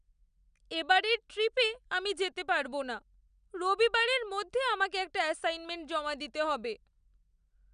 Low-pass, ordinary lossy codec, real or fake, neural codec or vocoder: 9.9 kHz; none; real; none